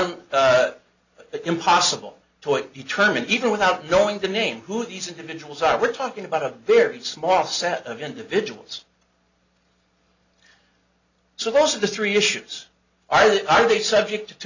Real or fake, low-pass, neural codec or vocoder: real; 7.2 kHz; none